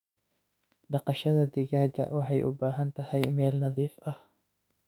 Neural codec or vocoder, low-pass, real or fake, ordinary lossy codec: autoencoder, 48 kHz, 32 numbers a frame, DAC-VAE, trained on Japanese speech; 19.8 kHz; fake; none